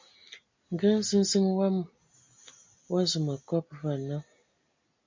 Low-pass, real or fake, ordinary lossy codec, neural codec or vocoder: 7.2 kHz; real; MP3, 64 kbps; none